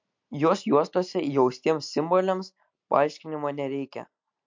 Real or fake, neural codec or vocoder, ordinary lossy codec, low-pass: real; none; MP3, 48 kbps; 7.2 kHz